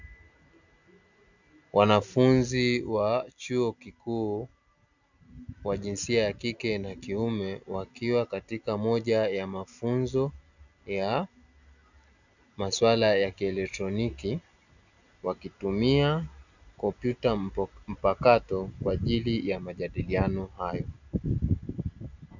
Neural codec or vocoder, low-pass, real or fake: none; 7.2 kHz; real